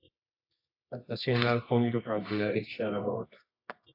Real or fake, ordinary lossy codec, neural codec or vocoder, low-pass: fake; AAC, 32 kbps; codec, 24 kHz, 0.9 kbps, WavTokenizer, medium music audio release; 5.4 kHz